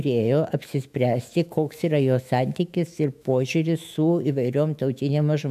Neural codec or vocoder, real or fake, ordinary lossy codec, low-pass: autoencoder, 48 kHz, 128 numbers a frame, DAC-VAE, trained on Japanese speech; fake; AAC, 96 kbps; 14.4 kHz